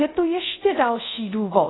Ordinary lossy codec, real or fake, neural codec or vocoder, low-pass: AAC, 16 kbps; fake; codec, 24 kHz, 0.5 kbps, DualCodec; 7.2 kHz